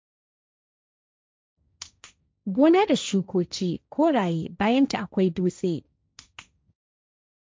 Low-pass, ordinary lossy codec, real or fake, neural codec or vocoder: none; none; fake; codec, 16 kHz, 1.1 kbps, Voila-Tokenizer